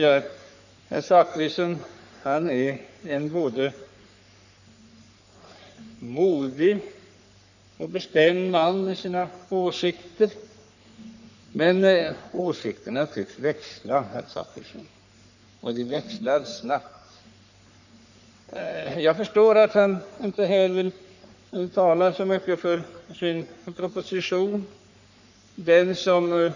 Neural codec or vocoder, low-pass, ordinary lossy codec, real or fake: codec, 44.1 kHz, 3.4 kbps, Pupu-Codec; 7.2 kHz; none; fake